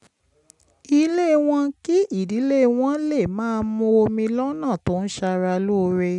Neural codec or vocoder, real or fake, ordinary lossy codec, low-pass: none; real; none; 10.8 kHz